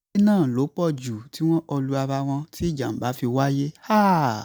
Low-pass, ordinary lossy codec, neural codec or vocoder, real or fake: none; none; none; real